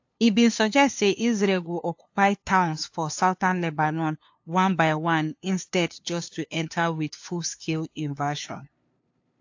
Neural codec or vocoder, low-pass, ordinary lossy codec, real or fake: codec, 16 kHz, 2 kbps, FunCodec, trained on LibriTTS, 25 frames a second; 7.2 kHz; AAC, 48 kbps; fake